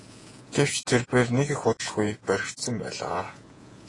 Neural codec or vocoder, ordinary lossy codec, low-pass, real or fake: vocoder, 48 kHz, 128 mel bands, Vocos; AAC, 32 kbps; 10.8 kHz; fake